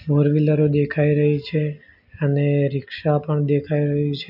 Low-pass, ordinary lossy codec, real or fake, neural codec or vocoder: 5.4 kHz; none; real; none